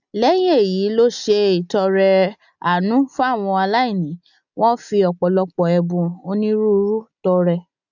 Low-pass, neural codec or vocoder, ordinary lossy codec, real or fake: 7.2 kHz; none; none; real